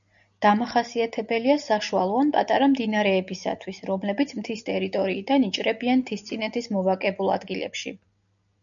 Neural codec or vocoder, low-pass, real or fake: none; 7.2 kHz; real